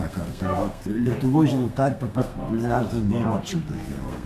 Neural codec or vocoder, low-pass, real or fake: codec, 32 kHz, 1.9 kbps, SNAC; 14.4 kHz; fake